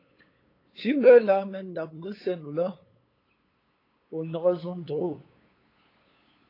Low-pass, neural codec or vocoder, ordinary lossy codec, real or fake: 5.4 kHz; codec, 16 kHz, 8 kbps, FunCodec, trained on LibriTTS, 25 frames a second; AAC, 24 kbps; fake